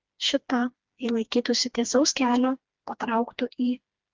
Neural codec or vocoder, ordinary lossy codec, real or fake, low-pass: codec, 16 kHz, 2 kbps, FreqCodec, smaller model; Opus, 24 kbps; fake; 7.2 kHz